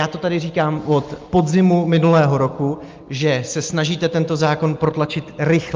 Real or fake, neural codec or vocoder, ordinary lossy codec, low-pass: real; none; Opus, 24 kbps; 7.2 kHz